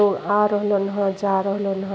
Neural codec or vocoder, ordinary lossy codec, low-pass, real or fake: none; none; none; real